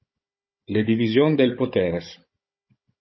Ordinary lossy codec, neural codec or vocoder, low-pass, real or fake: MP3, 24 kbps; codec, 16 kHz, 16 kbps, FunCodec, trained on Chinese and English, 50 frames a second; 7.2 kHz; fake